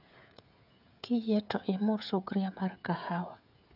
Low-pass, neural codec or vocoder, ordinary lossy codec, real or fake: 5.4 kHz; codec, 16 kHz, 16 kbps, FreqCodec, smaller model; none; fake